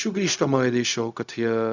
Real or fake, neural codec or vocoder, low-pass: fake; codec, 16 kHz, 0.4 kbps, LongCat-Audio-Codec; 7.2 kHz